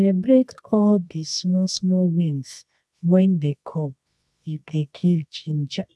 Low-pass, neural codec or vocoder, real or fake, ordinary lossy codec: none; codec, 24 kHz, 0.9 kbps, WavTokenizer, medium music audio release; fake; none